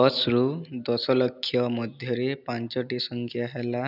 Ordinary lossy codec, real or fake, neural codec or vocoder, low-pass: none; real; none; 5.4 kHz